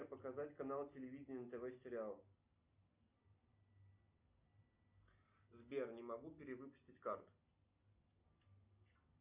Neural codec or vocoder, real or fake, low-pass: none; real; 3.6 kHz